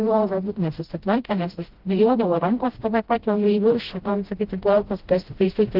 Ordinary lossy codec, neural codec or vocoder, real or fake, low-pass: Opus, 16 kbps; codec, 16 kHz, 0.5 kbps, FreqCodec, smaller model; fake; 5.4 kHz